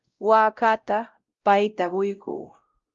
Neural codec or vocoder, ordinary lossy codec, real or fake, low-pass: codec, 16 kHz, 0.5 kbps, X-Codec, WavLM features, trained on Multilingual LibriSpeech; Opus, 32 kbps; fake; 7.2 kHz